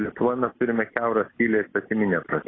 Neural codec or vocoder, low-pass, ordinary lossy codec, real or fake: none; 7.2 kHz; AAC, 16 kbps; real